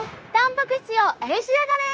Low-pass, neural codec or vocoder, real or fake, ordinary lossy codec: none; codec, 16 kHz, 0.9 kbps, LongCat-Audio-Codec; fake; none